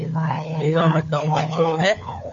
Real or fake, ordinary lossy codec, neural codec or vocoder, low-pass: fake; MP3, 48 kbps; codec, 16 kHz, 4 kbps, FunCodec, trained on LibriTTS, 50 frames a second; 7.2 kHz